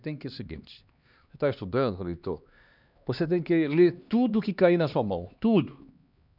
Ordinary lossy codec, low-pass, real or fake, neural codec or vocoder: none; 5.4 kHz; fake; codec, 16 kHz, 2 kbps, X-Codec, HuBERT features, trained on LibriSpeech